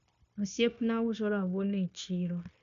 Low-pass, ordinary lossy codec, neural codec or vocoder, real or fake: 7.2 kHz; none; codec, 16 kHz, 0.9 kbps, LongCat-Audio-Codec; fake